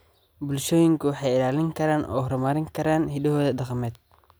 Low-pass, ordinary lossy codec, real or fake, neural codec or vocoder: none; none; real; none